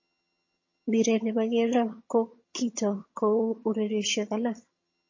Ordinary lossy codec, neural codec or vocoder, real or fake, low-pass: MP3, 32 kbps; vocoder, 22.05 kHz, 80 mel bands, HiFi-GAN; fake; 7.2 kHz